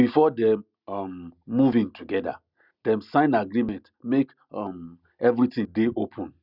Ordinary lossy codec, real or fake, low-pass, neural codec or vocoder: Opus, 64 kbps; real; 5.4 kHz; none